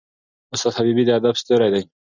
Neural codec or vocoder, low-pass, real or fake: none; 7.2 kHz; real